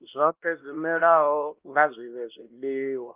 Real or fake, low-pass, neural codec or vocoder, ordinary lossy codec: fake; 3.6 kHz; codec, 16 kHz, 1 kbps, X-Codec, WavLM features, trained on Multilingual LibriSpeech; Opus, 32 kbps